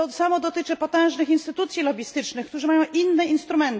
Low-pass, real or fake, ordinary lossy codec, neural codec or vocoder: none; real; none; none